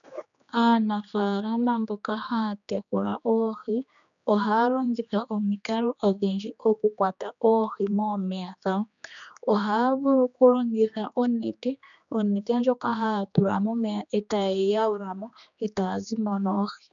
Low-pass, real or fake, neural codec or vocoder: 7.2 kHz; fake; codec, 16 kHz, 2 kbps, X-Codec, HuBERT features, trained on general audio